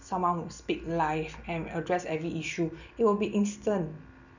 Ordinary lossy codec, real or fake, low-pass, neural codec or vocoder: none; real; 7.2 kHz; none